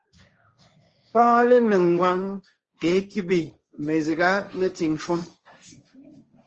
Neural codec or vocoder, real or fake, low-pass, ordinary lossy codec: codec, 16 kHz, 1.1 kbps, Voila-Tokenizer; fake; 7.2 kHz; Opus, 24 kbps